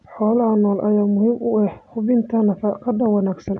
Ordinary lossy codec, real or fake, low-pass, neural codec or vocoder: none; real; 10.8 kHz; none